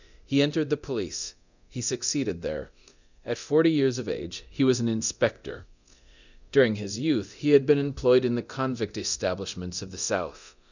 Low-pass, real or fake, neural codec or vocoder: 7.2 kHz; fake; codec, 24 kHz, 0.9 kbps, DualCodec